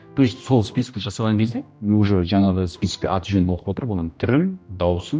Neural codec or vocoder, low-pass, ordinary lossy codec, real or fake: codec, 16 kHz, 1 kbps, X-Codec, HuBERT features, trained on general audio; none; none; fake